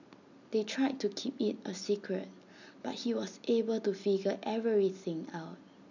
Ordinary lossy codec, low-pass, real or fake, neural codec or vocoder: none; 7.2 kHz; real; none